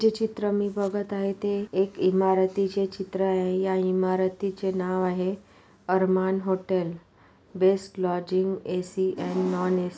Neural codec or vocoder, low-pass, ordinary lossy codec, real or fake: none; none; none; real